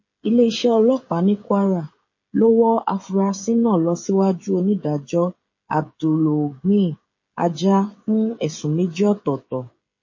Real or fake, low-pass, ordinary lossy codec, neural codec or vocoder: fake; 7.2 kHz; MP3, 32 kbps; codec, 16 kHz, 16 kbps, FreqCodec, smaller model